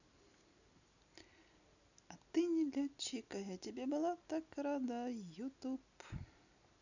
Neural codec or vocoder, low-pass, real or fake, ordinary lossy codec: none; 7.2 kHz; real; none